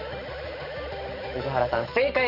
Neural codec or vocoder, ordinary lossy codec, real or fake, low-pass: codec, 44.1 kHz, 7.8 kbps, DAC; none; fake; 5.4 kHz